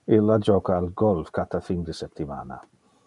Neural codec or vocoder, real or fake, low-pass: none; real; 10.8 kHz